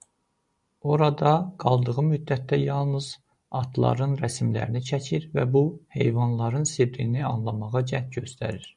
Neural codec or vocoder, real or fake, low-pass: none; real; 10.8 kHz